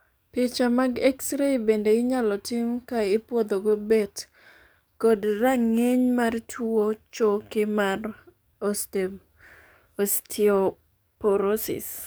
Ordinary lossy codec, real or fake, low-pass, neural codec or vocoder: none; fake; none; codec, 44.1 kHz, 7.8 kbps, DAC